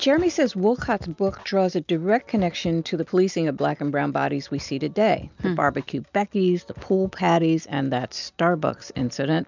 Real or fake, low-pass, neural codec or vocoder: real; 7.2 kHz; none